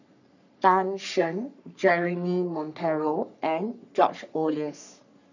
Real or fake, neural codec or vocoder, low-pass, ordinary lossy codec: fake; codec, 44.1 kHz, 3.4 kbps, Pupu-Codec; 7.2 kHz; none